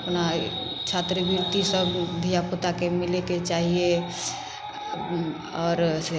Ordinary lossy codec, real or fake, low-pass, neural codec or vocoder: none; real; none; none